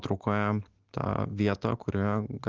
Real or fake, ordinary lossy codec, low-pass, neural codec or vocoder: real; Opus, 16 kbps; 7.2 kHz; none